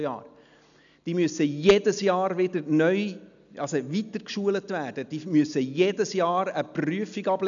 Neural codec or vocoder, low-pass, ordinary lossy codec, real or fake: none; 7.2 kHz; none; real